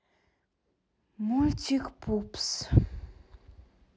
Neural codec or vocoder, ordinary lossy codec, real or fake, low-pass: none; none; real; none